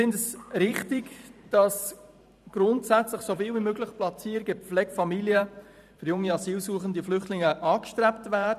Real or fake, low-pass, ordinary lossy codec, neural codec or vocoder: fake; 14.4 kHz; none; vocoder, 44.1 kHz, 128 mel bands every 512 samples, BigVGAN v2